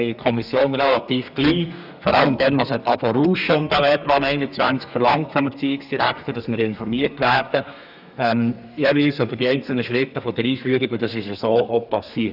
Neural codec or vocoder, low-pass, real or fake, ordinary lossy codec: codec, 32 kHz, 1.9 kbps, SNAC; 5.4 kHz; fake; none